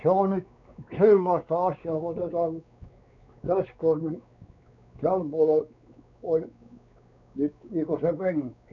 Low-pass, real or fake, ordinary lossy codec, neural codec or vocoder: 7.2 kHz; fake; none; codec, 16 kHz, 4 kbps, X-Codec, WavLM features, trained on Multilingual LibriSpeech